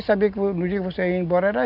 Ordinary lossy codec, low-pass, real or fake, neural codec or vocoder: none; 5.4 kHz; real; none